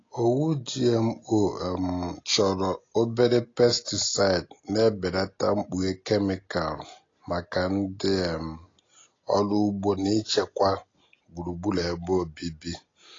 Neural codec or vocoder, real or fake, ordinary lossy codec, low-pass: none; real; AAC, 32 kbps; 7.2 kHz